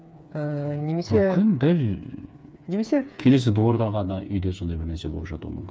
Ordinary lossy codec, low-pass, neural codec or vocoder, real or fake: none; none; codec, 16 kHz, 4 kbps, FreqCodec, smaller model; fake